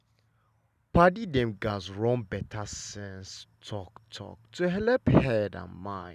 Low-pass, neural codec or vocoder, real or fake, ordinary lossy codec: 14.4 kHz; none; real; none